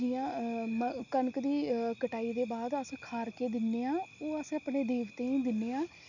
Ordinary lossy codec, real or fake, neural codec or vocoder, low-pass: none; real; none; 7.2 kHz